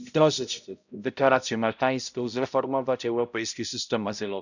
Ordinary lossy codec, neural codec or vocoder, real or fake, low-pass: none; codec, 16 kHz, 0.5 kbps, X-Codec, HuBERT features, trained on balanced general audio; fake; 7.2 kHz